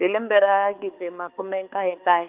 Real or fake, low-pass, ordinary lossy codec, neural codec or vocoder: fake; 3.6 kHz; Opus, 24 kbps; codec, 16 kHz, 4 kbps, X-Codec, HuBERT features, trained on balanced general audio